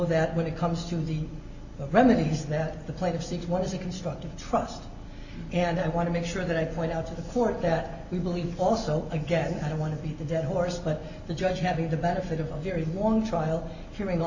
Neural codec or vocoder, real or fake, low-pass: none; real; 7.2 kHz